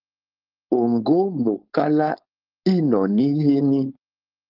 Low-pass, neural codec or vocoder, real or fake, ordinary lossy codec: 5.4 kHz; codec, 16 kHz, 4.8 kbps, FACodec; fake; Opus, 32 kbps